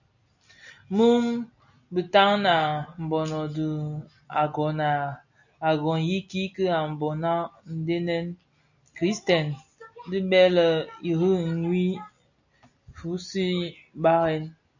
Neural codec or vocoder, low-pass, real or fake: none; 7.2 kHz; real